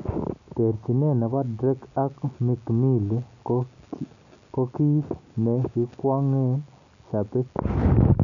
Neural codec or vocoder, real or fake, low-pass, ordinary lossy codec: none; real; 7.2 kHz; none